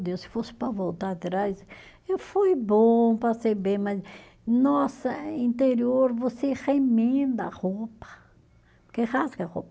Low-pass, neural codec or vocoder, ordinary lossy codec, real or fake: none; none; none; real